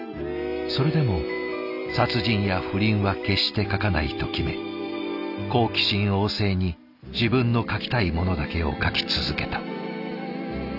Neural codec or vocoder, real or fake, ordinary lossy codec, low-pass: none; real; none; 5.4 kHz